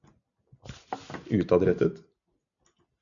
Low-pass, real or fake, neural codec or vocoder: 7.2 kHz; real; none